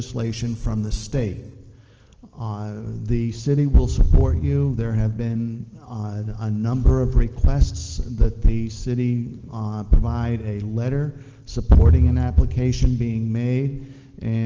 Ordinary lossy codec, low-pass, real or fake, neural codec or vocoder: Opus, 16 kbps; 7.2 kHz; real; none